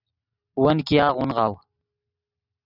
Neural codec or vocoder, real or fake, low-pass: none; real; 5.4 kHz